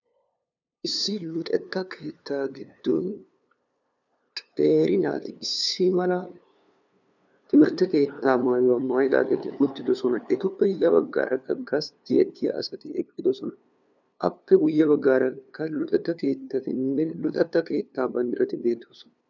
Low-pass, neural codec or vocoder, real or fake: 7.2 kHz; codec, 16 kHz, 2 kbps, FunCodec, trained on LibriTTS, 25 frames a second; fake